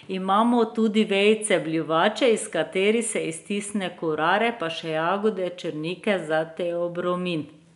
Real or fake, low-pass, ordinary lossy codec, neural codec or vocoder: real; 10.8 kHz; none; none